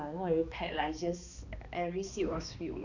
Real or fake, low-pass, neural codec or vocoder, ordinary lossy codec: fake; 7.2 kHz; codec, 16 kHz, 2 kbps, X-Codec, HuBERT features, trained on balanced general audio; none